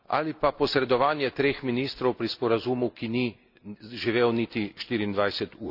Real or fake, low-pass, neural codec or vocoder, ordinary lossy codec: real; 5.4 kHz; none; none